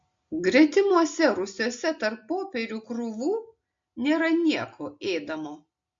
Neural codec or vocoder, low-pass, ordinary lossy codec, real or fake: none; 7.2 kHz; MP3, 48 kbps; real